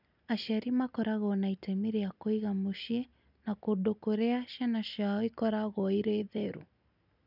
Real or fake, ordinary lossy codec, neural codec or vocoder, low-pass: real; none; none; 5.4 kHz